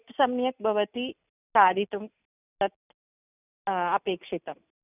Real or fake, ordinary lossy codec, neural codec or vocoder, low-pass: real; none; none; 3.6 kHz